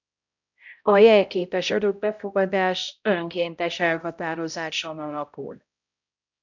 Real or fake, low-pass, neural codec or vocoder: fake; 7.2 kHz; codec, 16 kHz, 0.5 kbps, X-Codec, HuBERT features, trained on balanced general audio